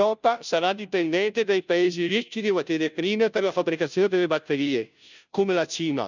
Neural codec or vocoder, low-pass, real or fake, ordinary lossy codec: codec, 16 kHz, 0.5 kbps, FunCodec, trained on Chinese and English, 25 frames a second; 7.2 kHz; fake; none